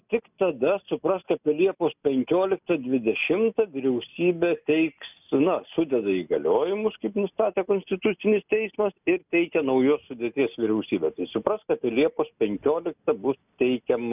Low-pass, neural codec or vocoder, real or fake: 3.6 kHz; none; real